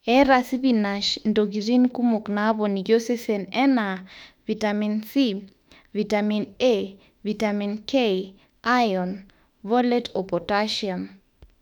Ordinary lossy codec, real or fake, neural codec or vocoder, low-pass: none; fake; autoencoder, 48 kHz, 32 numbers a frame, DAC-VAE, trained on Japanese speech; 19.8 kHz